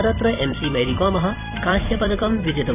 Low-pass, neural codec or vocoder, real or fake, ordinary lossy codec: 3.6 kHz; vocoder, 44.1 kHz, 128 mel bands every 256 samples, BigVGAN v2; fake; none